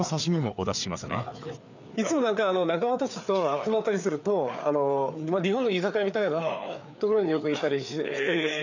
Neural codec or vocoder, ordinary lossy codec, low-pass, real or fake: codec, 16 kHz, 4 kbps, FreqCodec, larger model; none; 7.2 kHz; fake